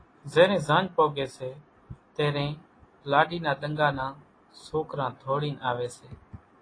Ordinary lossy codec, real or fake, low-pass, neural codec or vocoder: AAC, 32 kbps; real; 9.9 kHz; none